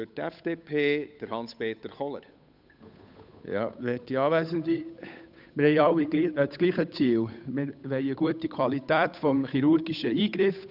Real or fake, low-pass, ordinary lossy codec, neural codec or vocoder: fake; 5.4 kHz; none; codec, 16 kHz, 8 kbps, FunCodec, trained on Chinese and English, 25 frames a second